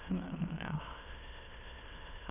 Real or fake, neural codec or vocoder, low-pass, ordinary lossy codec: fake; autoencoder, 22.05 kHz, a latent of 192 numbers a frame, VITS, trained on many speakers; 3.6 kHz; none